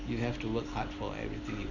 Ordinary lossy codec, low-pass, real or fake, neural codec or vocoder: none; 7.2 kHz; real; none